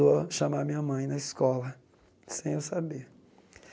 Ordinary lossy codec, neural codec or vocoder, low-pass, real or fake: none; none; none; real